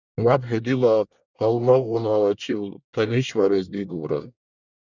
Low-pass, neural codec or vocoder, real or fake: 7.2 kHz; codec, 24 kHz, 1 kbps, SNAC; fake